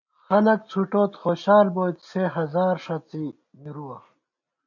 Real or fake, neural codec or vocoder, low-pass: real; none; 7.2 kHz